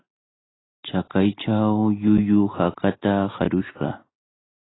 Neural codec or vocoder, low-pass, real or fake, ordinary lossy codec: none; 7.2 kHz; real; AAC, 16 kbps